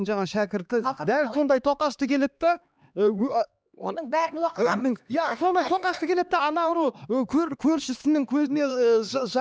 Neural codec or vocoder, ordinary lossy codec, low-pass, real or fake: codec, 16 kHz, 2 kbps, X-Codec, HuBERT features, trained on LibriSpeech; none; none; fake